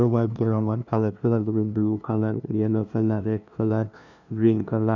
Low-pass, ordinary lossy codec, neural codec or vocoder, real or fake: 7.2 kHz; none; codec, 16 kHz, 0.5 kbps, FunCodec, trained on LibriTTS, 25 frames a second; fake